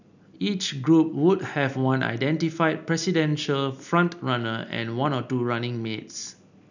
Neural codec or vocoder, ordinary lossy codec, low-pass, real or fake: none; none; 7.2 kHz; real